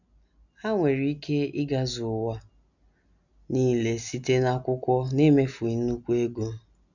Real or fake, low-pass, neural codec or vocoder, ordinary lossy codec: real; 7.2 kHz; none; none